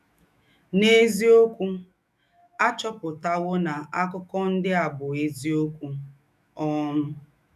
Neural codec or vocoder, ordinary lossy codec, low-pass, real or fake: autoencoder, 48 kHz, 128 numbers a frame, DAC-VAE, trained on Japanese speech; none; 14.4 kHz; fake